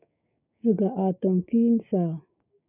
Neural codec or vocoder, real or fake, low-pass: codec, 16 kHz, 6 kbps, DAC; fake; 3.6 kHz